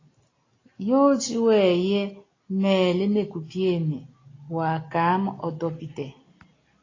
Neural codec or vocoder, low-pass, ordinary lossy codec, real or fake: none; 7.2 kHz; AAC, 32 kbps; real